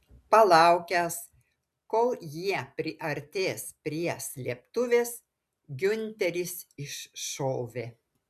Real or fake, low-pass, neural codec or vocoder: real; 14.4 kHz; none